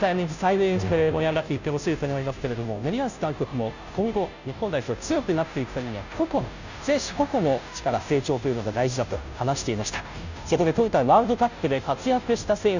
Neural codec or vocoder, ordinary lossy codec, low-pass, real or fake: codec, 16 kHz, 0.5 kbps, FunCodec, trained on Chinese and English, 25 frames a second; none; 7.2 kHz; fake